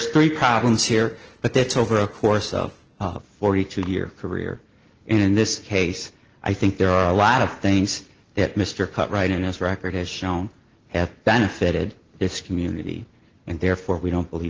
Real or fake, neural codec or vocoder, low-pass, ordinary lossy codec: real; none; 7.2 kHz; Opus, 16 kbps